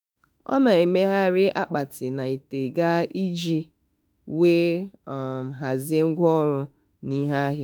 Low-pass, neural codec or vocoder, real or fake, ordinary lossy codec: none; autoencoder, 48 kHz, 32 numbers a frame, DAC-VAE, trained on Japanese speech; fake; none